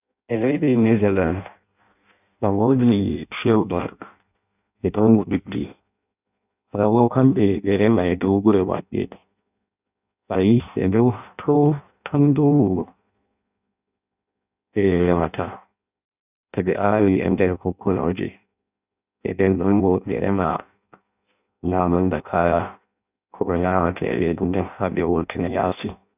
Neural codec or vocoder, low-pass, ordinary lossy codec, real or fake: codec, 16 kHz in and 24 kHz out, 0.6 kbps, FireRedTTS-2 codec; 3.6 kHz; none; fake